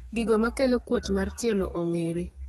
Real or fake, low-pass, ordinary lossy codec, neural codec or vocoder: fake; 14.4 kHz; AAC, 32 kbps; codec, 32 kHz, 1.9 kbps, SNAC